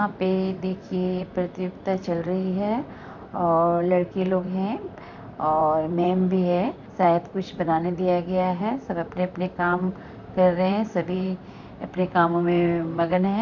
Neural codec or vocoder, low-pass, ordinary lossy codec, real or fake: vocoder, 44.1 kHz, 128 mel bands, Pupu-Vocoder; 7.2 kHz; none; fake